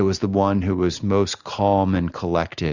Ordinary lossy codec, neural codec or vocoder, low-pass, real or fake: Opus, 64 kbps; none; 7.2 kHz; real